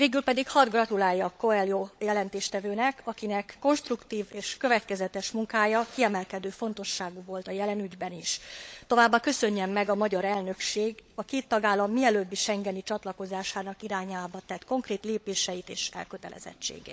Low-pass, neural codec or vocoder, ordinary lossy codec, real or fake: none; codec, 16 kHz, 16 kbps, FunCodec, trained on LibriTTS, 50 frames a second; none; fake